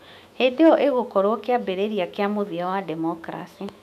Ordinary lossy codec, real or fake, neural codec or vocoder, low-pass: none; fake; autoencoder, 48 kHz, 128 numbers a frame, DAC-VAE, trained on Japanese speech; 14.4 kHz